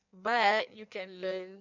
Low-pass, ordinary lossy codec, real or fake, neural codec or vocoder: 7.2 kHz; none; fake; codec, 16 kHz in and 24 kHz out, 1.1 kbps, FireRedTTS-2 codec